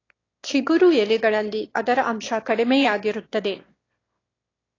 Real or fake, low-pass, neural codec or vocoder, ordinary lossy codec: fake; 7.2 kHz; autoencoder, 22.05 kHz, a latent of 192 numbers a frame, VITS, trained on one speaker; AAC, 32 kbps